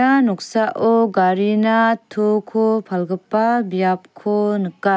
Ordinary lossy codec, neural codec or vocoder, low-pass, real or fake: none; none; none; real